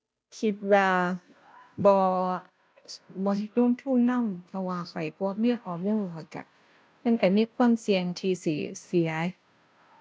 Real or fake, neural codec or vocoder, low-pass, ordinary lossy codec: fake; codec, 16 kHz, 0.5 kbps, FunCodec, trained on Chinese and English, 25 frames a second; none; none